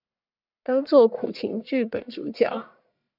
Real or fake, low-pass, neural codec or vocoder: fake; 5.4 kHz; codec, 44.1 kHz, 1.7 kbps, Pupu-Codec